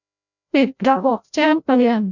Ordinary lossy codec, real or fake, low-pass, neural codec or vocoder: none; fake; 7.2 kHz; codec, 16 kHz, 0.5 kbps, FreqCodec, larger model